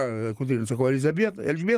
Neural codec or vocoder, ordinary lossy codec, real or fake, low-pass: none; Opus, 32 kbps; real; 14.4 kHz